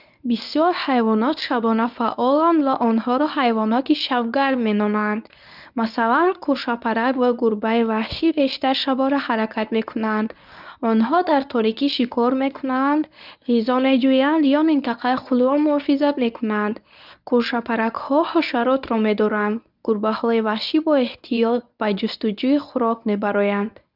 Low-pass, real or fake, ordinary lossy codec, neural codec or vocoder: 5.4 kHz; fake; none; codec, 24 kHz, 0.9 kbps, WavTokenizer, medium speech release version 1